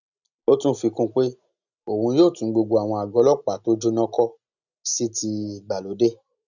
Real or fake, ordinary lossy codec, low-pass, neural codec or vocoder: real; none; 7.2 kHz; none